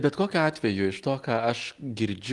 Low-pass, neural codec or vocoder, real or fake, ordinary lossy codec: 10.8 kHz; none; real; Opus, 24 kbps